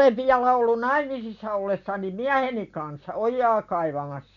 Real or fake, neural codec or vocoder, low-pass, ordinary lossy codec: real; none; 7.2 kHz; none